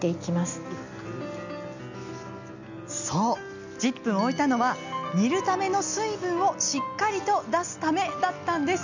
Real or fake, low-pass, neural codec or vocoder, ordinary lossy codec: real; 7.2 kHz; none; none